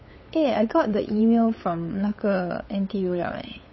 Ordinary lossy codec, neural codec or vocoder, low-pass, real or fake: MP3, 24 kbps; codec, 16 kHz, 8 kbps, FunCodec, trained on LibriTTS, 25 frames a second; 7.2 kHz; fake